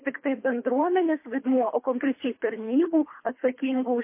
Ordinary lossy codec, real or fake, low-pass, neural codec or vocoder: MP3, 24 kbps; fake; 3.6 kHz; codec, 24 kHz, 3 kbps, HILCodec